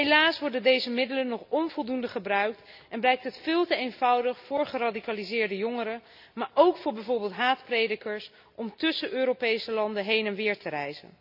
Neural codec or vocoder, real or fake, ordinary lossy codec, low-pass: none; real; none; 5.4 kHz